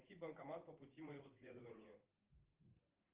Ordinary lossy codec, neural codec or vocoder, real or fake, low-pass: Opus, 32 kbps; vocoder, 44.1 kHz, 80 mel bands, Vocos; fake; 3.6 kHz